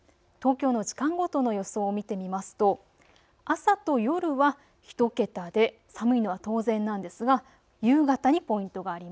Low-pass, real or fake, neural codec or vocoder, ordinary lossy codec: none; real; none; none